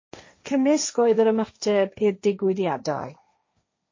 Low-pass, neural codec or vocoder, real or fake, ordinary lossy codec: 7.2 kHz; codec, 16 kHz, 1.1 kbps, Voila-Tokenizer; fake; MP3, 32 kbps